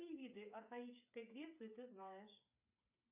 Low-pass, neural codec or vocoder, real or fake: 3.6 kHz; codec, 16 kHz, 8 kbps, FreqCodec, smaller model; fake